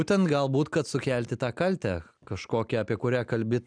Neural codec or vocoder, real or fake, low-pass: none; real; 9.9 kHz